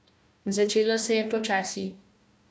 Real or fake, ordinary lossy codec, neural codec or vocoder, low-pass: fake; none; codec, 16 kHz, 1 kbps, FunCodec, trained on Chinese and English, 50 frames a second; none